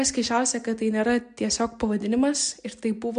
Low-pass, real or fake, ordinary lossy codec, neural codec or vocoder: 9.9 kHz; real; MP3, 64 kbps; none